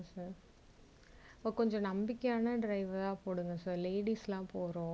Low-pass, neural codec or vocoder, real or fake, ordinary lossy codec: none; none; real; none